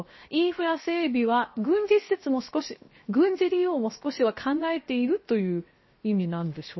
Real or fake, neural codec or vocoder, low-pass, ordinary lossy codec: fake; codec, 16 kHz, 0.7 kbps, FocalCodec; 7.2 kHz; MP3, 24 kbps